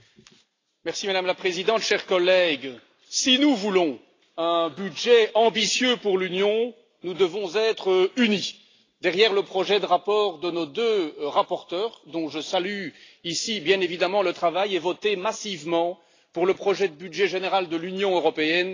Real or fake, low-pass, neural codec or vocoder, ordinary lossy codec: real; 7.2 kHz; none; AAC, 32 kbps